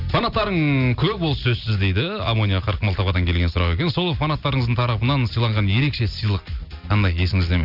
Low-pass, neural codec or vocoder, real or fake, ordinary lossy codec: 5.4 kHz; none; real; none